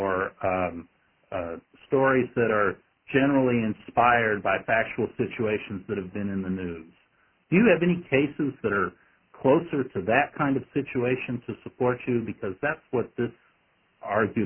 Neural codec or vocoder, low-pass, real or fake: none; 3.6 kHz; real